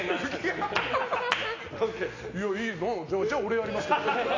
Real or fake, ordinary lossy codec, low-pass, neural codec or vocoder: real; AAC, 32 kbps; 7.2 kHz; none